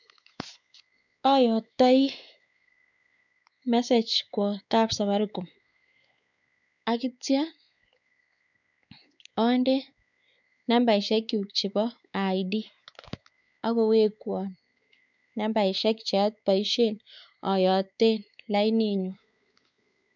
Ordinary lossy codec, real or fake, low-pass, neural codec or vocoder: none; fake; 7.2 kHz; codec, 16 kHz, 4 kbps, X-Codec, WavLM features, trained on Multilingual LibriSpeech